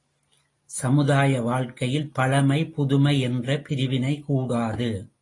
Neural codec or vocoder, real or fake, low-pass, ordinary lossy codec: none; real; 10.8 kHz; AAC, 32 kbps